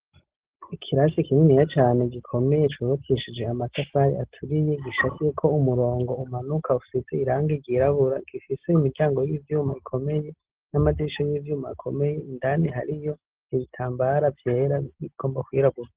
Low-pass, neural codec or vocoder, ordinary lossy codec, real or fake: 3.6 kHz; none; Opus, 16 kbps; real